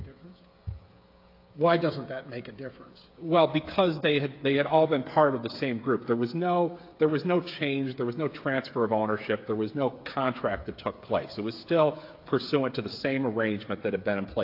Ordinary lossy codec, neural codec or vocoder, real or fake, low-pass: AAC, 32 kbps; codec, 16 kHz, 8 kbps, FreqCodec, smaller model; fake; 5.4 kHz